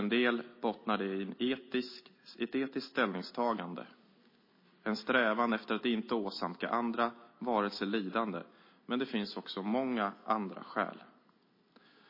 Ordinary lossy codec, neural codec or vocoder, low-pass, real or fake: MP3, 24 kbps; none; 5.4 kHz; real